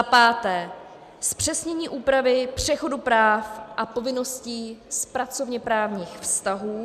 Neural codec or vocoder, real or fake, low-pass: none; real; 14.4 kHz